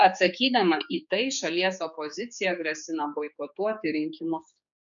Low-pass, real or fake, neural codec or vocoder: 7.2 kHz; fake; codec, 16 kHz, 4 kbps, X-Codec, HuBERT features, trained on general audio